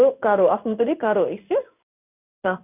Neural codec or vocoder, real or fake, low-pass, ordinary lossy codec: codec, 16 kHz in and 24 kHz out, 1 kbps, XY-Tokenizer; fake; 3.6 kHz; none